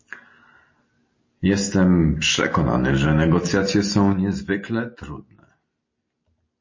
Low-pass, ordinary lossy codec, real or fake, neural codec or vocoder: 7.2 kHz; MP3, 32 kbps; real; none